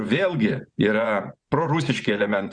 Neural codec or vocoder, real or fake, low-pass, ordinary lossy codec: vocoder, 24 kHz, 100 mel bands, Vocos; fake; 9.9 kHz; Opus, 64 kbps